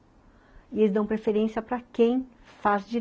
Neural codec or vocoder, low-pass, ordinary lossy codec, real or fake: none; none; none; real